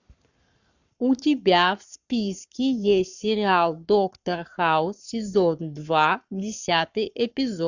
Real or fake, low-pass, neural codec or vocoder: fake; 7.2 kHz; codec, 44.1 kHz, 7.8 kbps, Pupu-Codec